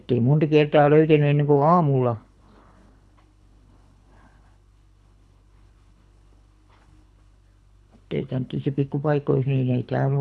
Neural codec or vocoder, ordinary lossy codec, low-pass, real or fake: codec, 24 kHz, 6 kbps, HILCodec; none; none; fake